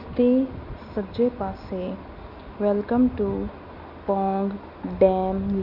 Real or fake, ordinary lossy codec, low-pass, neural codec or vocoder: real; none; 5.4 kHz; none